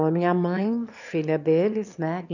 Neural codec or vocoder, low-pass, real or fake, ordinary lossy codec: autoencoder, 22.05 kHz, a latent of 192 numbers a frame, VITS, trained on one speaker; 7.2 kHz; fake; none